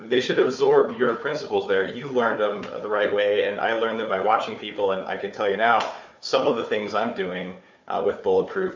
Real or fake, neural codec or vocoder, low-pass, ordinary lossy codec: fake; codec, 16 kHz, 4 kbps, FunCodec, trained on Chinese and English, 50 frames a second; 7.2 kHz; MP3, 48 kbps